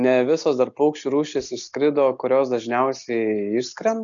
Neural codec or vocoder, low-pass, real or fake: none; 7.2 kHz; real